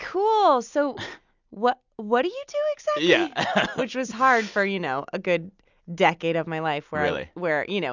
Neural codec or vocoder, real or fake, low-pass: none; real; 7.2 kHz